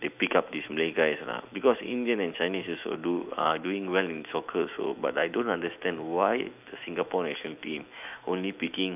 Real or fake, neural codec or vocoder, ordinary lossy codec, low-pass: fake; autoencoder, 48 kHz, 128 numbers a frame, DAC-VAE, trained on Japanese speech; none; 3.6 kHz